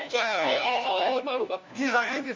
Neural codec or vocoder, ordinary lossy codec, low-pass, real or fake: codec, 16 kHz, 1 kbps, FunCodec, trained on LibriTTS, 50 frames a second; MP3, 64 kbps; 7.2 kHz; fake